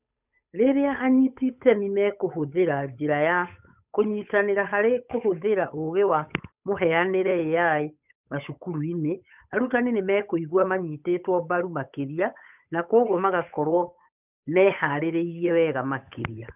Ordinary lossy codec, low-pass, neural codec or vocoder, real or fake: none; 3.6 kHz; codec, 16 kHz, 8 kbps, FunCodec, trained on Chinese and English, 25 frames a second; fake